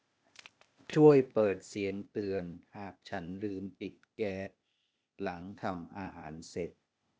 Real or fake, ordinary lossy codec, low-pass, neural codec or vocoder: fake; none; none; codec, 16 kHz, 0.8 kbps, ZipCodec